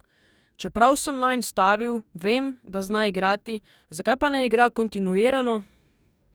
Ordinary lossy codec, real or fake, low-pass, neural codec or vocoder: none; fake; none; codec, 44.1 kHz, 2.6 kbps, DAC